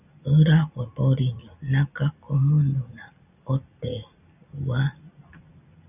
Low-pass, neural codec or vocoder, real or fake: 3.6 kHz; none; real